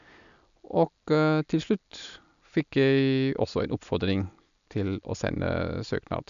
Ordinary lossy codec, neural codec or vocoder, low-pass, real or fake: none; none; 7.2 kHz; real